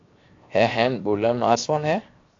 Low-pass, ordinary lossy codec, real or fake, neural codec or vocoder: 7.2 kHz; AAC, 48 kbps; fake; codec, 16 kHz, 0.7 kbps, FocalCodec